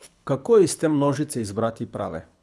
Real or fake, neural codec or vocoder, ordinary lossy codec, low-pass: fake; codec, 24 kHz, 6 kbps, HILCodec; none; none